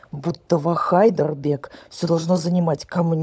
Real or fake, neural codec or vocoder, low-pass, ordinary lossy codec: fake; codec, 16 kHz, 16 kbps, FunCodec, trained on LibriTTS, 50 frames a second; none; none